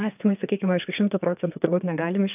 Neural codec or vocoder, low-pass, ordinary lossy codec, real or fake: codec, 32 kHz, 1.9 kbps, SNAC; 3.6 kHz; AAC, 32 kbps; fake